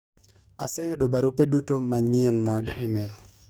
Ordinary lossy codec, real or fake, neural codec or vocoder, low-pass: none; fake; codec, 44.1 kHz, 2.6 kbps, DAC; none